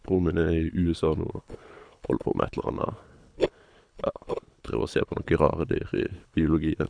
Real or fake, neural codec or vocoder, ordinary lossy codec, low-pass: fake; codec, 24 kHz, 6 kbps, HILCodec; none; 9.9 kHz